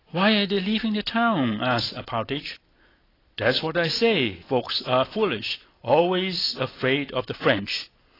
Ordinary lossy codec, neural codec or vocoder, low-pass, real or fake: AAC, 24 kbps; none; 5.4 kHz; real